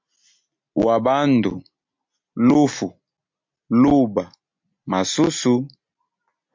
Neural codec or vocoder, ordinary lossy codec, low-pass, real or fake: none; MP3, 48 kbps; 7.2 kHz; real